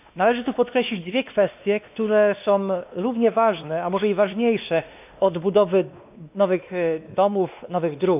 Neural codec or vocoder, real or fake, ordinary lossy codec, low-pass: codec, 16 kHz, 2 kbps, X-Codec, WavLM features, trained on Multilingual LibriSpeech; fake; none; 3.6 kHz